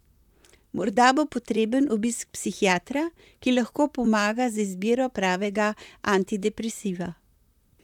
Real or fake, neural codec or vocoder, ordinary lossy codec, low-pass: fake; vocoder, 44.1 kHz, 128 mel bands, Pupu-Vocoder; none; 19.8 kHz